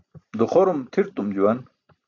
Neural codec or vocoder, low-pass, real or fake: none; 7.2 kHz; real